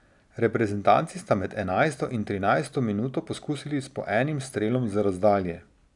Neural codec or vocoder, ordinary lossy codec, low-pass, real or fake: none; none; 10.8 kHz; real